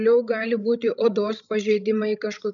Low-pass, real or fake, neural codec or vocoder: 7.2 kHz; fake; codec, 16 kHz, 16 kbps, FreqCodec, larger model